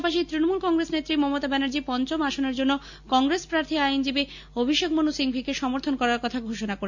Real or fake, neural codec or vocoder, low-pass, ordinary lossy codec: real; none; 7.2 kHz; none